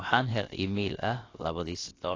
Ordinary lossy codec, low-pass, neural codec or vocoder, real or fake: AAC, 32 kbps; 7.2 kHz; codec, 16 kHz, about 1 kbps, DyCAST, with the encoder's durations; fake